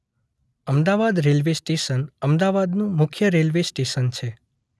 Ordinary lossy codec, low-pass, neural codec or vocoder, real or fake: none; none; none; real